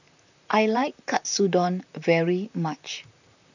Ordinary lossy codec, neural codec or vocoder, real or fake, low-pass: none; vocoder, 44.1 kHz, 128 mel bands, Pupu-Vocoder; fake; 7.2 kHz